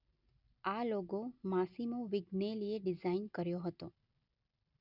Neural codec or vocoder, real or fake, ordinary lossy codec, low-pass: none; real; none; 5.4 kHz